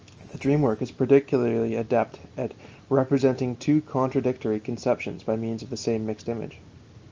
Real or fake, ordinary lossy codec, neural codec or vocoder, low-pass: real; Opus, 24 kbps; none; 7.2 kHz